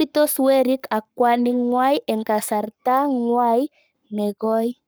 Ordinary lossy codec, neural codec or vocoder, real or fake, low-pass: none; codec, 44.1 kHz, 7.8 kbps, Pupu-Codec; fake; none